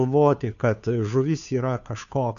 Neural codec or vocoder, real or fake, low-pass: codec, 16 kHz, 2 kbps, FunCodec, trained on Chinese and English, 25 frames a second; fake; 7.2 kHz